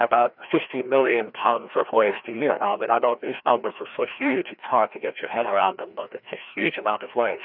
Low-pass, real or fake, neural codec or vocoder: 5.4 kHz; fake; codec, 16 kHz, 1 kbps, FreqCodec, larger model